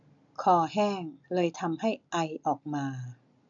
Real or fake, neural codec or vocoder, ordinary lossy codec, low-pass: real; none; none; 7.2 kHz